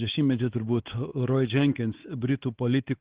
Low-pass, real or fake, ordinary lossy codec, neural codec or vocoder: 3.6 kHz; fake; Opus, 16 kbps; codec, 16 kHz, 4 kbps, X-Codec, WavLM features, trained on Multilingual LibriSpeech